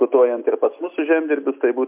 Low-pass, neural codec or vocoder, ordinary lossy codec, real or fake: 3.6 kHz; none; MP3, 32 kbps; real